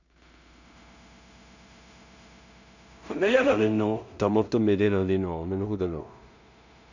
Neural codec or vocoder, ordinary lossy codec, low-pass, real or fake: codec, 16 kHz in and 24 kHz out, 0.4 kbps, LongCat-Audio-Codec, two codebook decoder; none; 7.2 kHz; fake